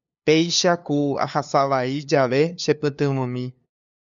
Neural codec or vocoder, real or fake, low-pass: codec, 16 kHz, 2 kbps, FunCodec, trained on LibriTTS, 25 frames a second; fake; 7.2 kHz